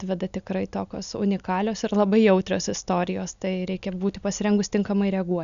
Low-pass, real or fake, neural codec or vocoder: 7.2 kHz; real; none